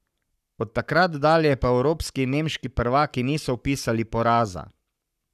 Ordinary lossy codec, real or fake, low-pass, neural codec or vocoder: none; fake; 14.4 kHz; codec, 44.1 kHz, 7.8 kbps, Pupu-Codec